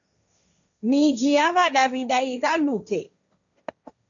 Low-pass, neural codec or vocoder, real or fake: 7.2 kHz; codec, 16 kHz, 1.1 kbps, Voila-Tokenizer; fake